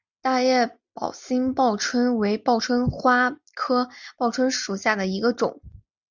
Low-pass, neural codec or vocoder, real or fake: 7.2 kHz; none; real